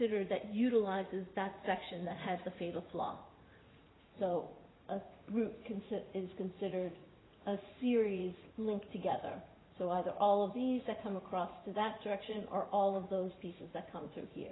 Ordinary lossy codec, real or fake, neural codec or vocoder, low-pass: AAC, 16 kbps; fake; vocoder, 44.1 kHz, 80 mel bands, Vocos; 7.2 kHz